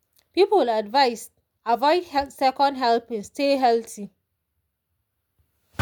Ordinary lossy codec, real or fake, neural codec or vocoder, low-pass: none; real; none; 19.8 kHz